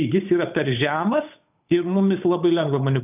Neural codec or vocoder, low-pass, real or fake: codec, 16 kHz, 8 kbps, FunCodec, trained on Chinese and English, 25 frames a second; 3.6 kHz; fake